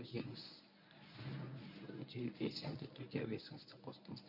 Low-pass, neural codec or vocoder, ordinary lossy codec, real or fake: 5.4 kHz; codec, 24 kHz, 0.9 kbps, WavTokenizer, medium speech release version 1; none; fake